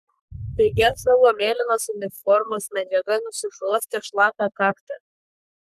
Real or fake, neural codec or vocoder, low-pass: fake; codec, 44.1 kHz, 3.4 kbps, Pupu-Codec; 14.4 kHz